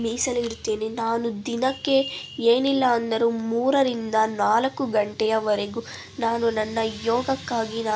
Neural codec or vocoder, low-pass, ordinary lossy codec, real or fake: none; none; none; real